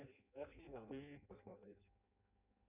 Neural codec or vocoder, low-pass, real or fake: codec, 16 kHz in and 24 kHz out, 0.6 kbps, FireRedTTS-2 codec; 3.6 kHz; fake